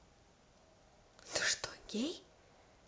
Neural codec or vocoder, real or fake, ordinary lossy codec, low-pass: none; real; none; none